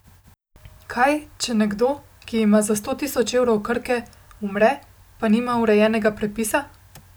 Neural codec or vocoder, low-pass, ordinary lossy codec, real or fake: none; none; none; real